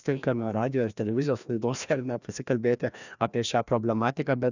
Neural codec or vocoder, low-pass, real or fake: codec, 16 kHz, 1 kbps, FreqCodec, larger model; 7.2 kHz; fake